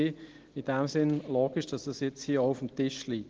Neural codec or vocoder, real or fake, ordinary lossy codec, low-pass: none; real; Opus, 16 kbps; 7.2 kHz